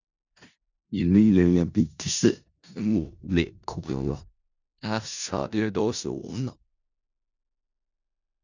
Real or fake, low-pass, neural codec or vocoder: fake; 7.2 kHz; codec, 16 kHz in and 24 kHz out, 0.4 kbps, LongCat-Audio-Codec, four codebook decoder